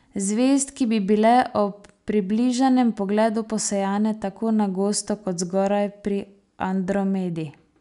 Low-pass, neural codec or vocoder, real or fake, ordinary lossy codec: 10.8 kHz; none; real; none